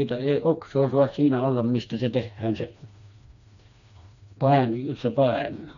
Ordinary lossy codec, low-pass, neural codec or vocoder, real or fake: none; 7.2 kHz; codec, 16 kHz, 2 kbps, FreqCodec, smaller model; fake